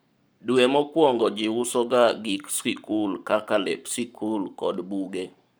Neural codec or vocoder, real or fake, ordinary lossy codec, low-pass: codec, 44.1 kHz, 7.8 kbps, Pupu-Codec; fake; none; none